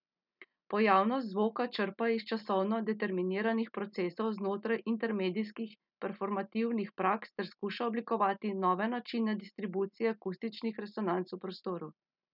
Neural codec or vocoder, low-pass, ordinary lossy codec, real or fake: none; 5.4 kHz; none; real